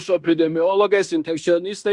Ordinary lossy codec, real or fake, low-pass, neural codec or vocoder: Opus, 64 kbps; fake; 10.8 kHz; codec, 16 kHz in and 24 kHz out, 0.9 kbps, LongCat-Audio-Codec, fine tuned four codebook decoder